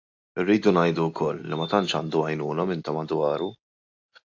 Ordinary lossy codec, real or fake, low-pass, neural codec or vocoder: AAC, 48 kbps; real; 7.2 kHz; none